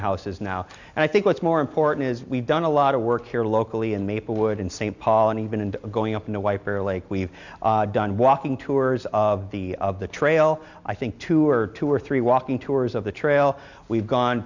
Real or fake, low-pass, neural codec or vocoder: real; 7.2 kHz; none